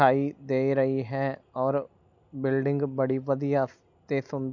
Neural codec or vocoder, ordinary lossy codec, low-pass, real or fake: none; none; 7.2 kHz; real